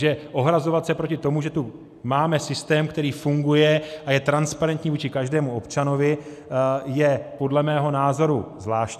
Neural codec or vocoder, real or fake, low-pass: none; real; 14.4 kHz